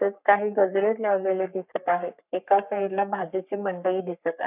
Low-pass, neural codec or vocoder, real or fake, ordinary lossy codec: 3.6 kHz; codec, 44.1 kHz, 3.4 kbps, Pupu-Codec; fake; none